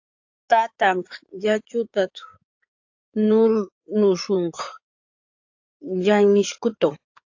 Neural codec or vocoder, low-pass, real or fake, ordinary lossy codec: codec, 16 kHz in and 24 kHz out, 2.2 kbps, FireRedTTS-2 codec; 7.2 kHz; fake; AAC, 48 kbps